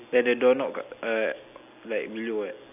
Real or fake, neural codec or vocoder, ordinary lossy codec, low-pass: real; none; AAC, 32 kbps; 3.6 kHz